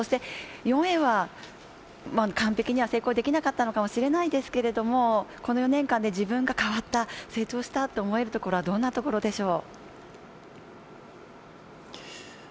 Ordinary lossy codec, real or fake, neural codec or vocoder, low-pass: none; real; none; none